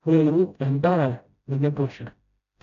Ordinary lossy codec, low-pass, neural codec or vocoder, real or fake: none; 7.2 kHz; codec, 16 kHz, 0.5 kbps, FreqCodec, smaller model; fake